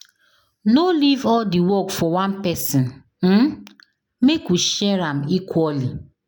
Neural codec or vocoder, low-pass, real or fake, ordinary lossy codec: none; none; real; none